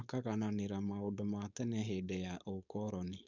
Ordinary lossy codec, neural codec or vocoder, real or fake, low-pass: none; codec, 16 kHz, 4.8 kbps, FACodec; fake; 7.2 kHz